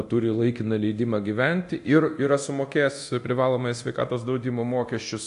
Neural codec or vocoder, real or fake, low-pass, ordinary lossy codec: codec, 24 kHz, 0.9 kbps, DualCodec; fake; 10.8 kHz; AAC, 64 kbps